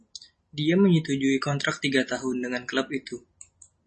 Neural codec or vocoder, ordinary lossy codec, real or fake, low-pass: none; MP3, 32 kbps; real; 10.8 kHz